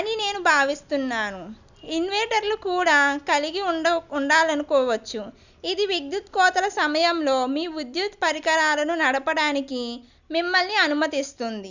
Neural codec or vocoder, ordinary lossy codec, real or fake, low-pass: none; none; real; 7.2 kHz